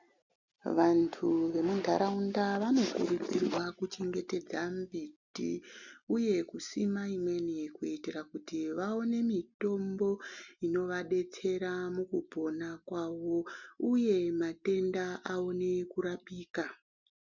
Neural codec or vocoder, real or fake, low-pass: none; real; 7.2 kHz